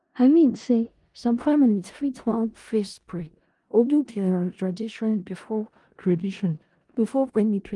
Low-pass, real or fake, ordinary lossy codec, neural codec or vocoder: 10.8 kHz; fake; Opus, 24 kbps; codec, 16 kHz in and 24 kHz out, 0.4 kbps, LongCat-Audio-Codec, four codebook decoder